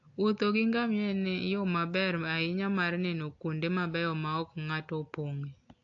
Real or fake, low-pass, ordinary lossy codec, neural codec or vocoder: real; 7.2 kHz; AAC, 48 kbps; none